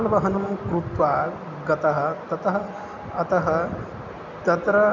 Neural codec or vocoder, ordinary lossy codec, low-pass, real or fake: none; none; 7.2 kHz; real